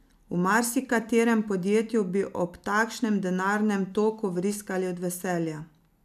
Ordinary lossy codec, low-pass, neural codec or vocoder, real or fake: none; 14.4 kHz; none; real